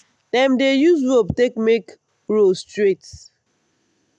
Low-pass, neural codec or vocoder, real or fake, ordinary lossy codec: none; none; real; none